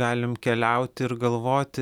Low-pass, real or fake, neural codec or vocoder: 19.8 kHz; real; none